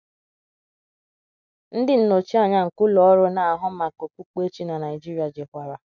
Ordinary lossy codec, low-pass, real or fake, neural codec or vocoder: none; 7.2 kHz; real; none